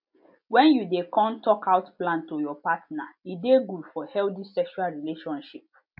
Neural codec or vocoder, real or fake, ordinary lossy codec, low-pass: none; real; MP3, 48 kbps; 5.4 kHz